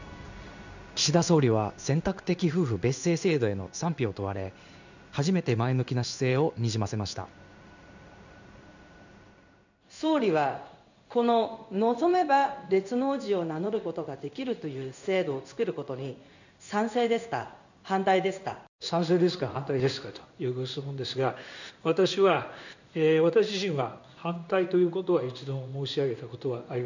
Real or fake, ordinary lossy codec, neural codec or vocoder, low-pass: fake; none; codec, 16 kHz in and 24 kHz out, 1 kbps, XY-Tokenizer; 7.2 kHz